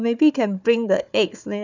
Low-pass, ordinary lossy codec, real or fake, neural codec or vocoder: 7.2 kHz; none; fake; codec, 16 kHz, 4 kbps, FunCodec, trained on Chinese and English, 50 frames a second